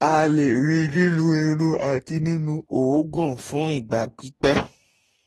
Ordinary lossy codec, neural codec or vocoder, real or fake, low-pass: AAC, 32 kbps; codec, 44.1 kHz, 2.6 kbps, DAC; fake; 19.8 kHz